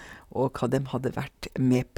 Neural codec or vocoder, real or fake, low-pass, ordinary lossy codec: vocoder, 44.1 kHz, 128 mel bands, Pupu-Vocoder; fake; 19.8 kHz; none